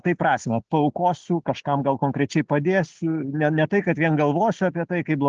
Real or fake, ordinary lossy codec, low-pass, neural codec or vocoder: real; Opus, 16 kbps; 7.2 kHz; none